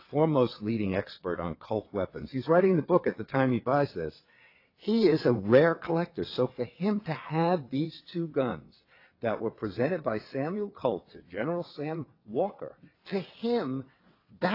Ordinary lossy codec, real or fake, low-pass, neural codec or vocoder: AAC, 32 kbps; fake; 5.4 kHz; vocoder, 22.05 kHz, 80 mel bands, WaveNeXt